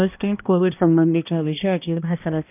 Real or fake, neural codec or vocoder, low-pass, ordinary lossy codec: fake; codec, 16 kHz, 1 kbps, X-Codec, HuBERT features, trained on balanced general audio; 3.6 kHz; none